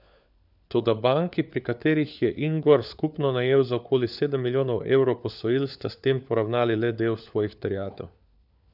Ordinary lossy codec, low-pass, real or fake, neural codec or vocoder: none; 5.4 kHz; fake; codec, 16 kHz, 4 kbps, FunCodec, trained on LibriTTS, 50 frames a second